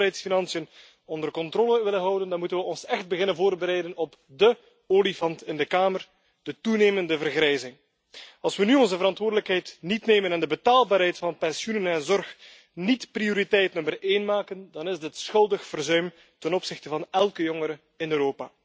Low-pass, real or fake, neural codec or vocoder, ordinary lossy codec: none; real; none; none